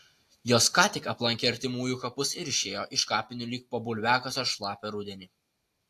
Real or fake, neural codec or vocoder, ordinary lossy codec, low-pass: real; none; AAC, 64 kbps; 14.4 kHz